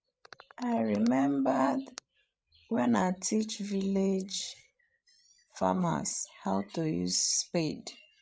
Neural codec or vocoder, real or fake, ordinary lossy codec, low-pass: codec, 16 kHz, 8 kbps, FreqCodec, larger model; fake; none; none